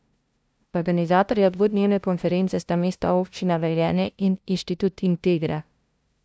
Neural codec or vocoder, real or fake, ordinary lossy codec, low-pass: codec, 16 kHz, 0.5 kbps, FunCodec, trained on LibriTTS, 25 frames a second; fake; none; none